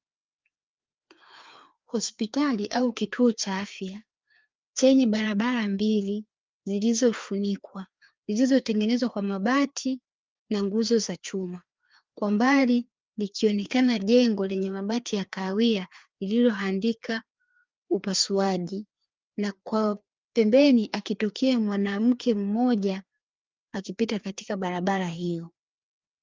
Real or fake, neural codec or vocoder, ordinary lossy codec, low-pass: fake; codec, 16 kHz, 2 kbps, FreqCodec, larger model; Opus, 24 kbps; 7.2 kHz